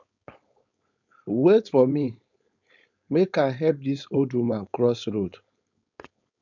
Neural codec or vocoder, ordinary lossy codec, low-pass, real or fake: codec, 16 kHz, 4.8 kbps, FACodec; none; 7.2 kHz; fake